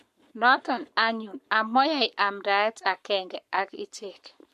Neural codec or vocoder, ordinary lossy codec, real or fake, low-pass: codec, 44.1 kHz, 7.8 kbps, Pupu-Codec; MP3, 64 kbps; fake; 14.4 kHz